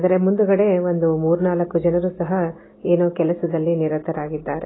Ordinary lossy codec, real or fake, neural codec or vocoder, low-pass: AAC, 16 kbps; real; none; 7.2 kHz